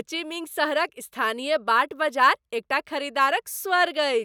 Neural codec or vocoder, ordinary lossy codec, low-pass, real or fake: none; none; none; real